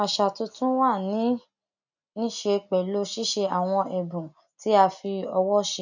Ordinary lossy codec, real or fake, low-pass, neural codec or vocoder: none; real; 7.2 kHz; none